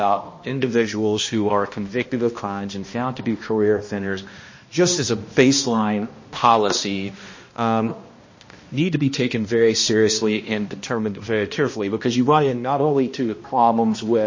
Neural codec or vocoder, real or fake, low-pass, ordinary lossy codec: codec, 16 kHz, 1 kbps, X-Codec, HuBERT features, trained on balanced general audio; fake; 7.2 kHz; MP3, 32 kbps